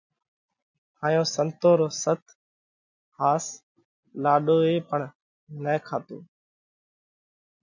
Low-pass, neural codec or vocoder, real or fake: 7.2 kHz; none; real